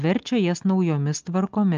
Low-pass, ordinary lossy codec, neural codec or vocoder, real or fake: 7.2 kHz; Opus, 24 kbps; none; real